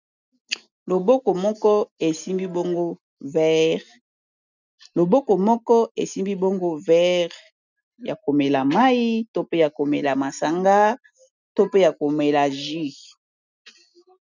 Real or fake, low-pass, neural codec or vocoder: real; 7.2 kHz; none